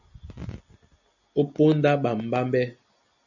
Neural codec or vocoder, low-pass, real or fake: none; 7.2 kHz; real